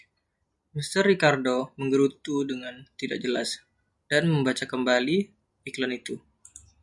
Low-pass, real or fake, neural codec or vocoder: 10.8 kHz; real; none